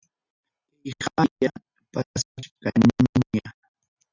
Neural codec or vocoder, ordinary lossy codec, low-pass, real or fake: none; Opus, 64 kbps; 7.2 kHz; real